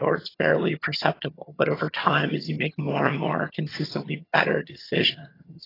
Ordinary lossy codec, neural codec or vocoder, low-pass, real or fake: AAC, 24 kbps; vocoder, 22.05 kHz, 80 mel bands, HiFi-GAN; 5.4 kHz; fake